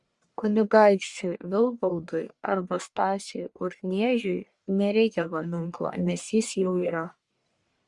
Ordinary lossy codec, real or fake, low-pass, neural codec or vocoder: Opus, 64 kbps; fake; 10.8 kHz; codec, 44.1 kHz, 1.7 kbps, Pupu-Codec